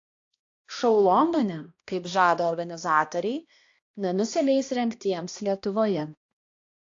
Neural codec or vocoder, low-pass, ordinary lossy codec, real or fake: codec, 16 kHz, 1 kbps, X-Codec, HuBERT features, trained on balanced general audio; 7.2 kHz; AAC, 48 kbps; fake